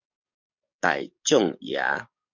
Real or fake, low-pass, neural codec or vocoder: fake; 7.2 kHz; codec, 44.1 kHz, 7.8 kbps, DAC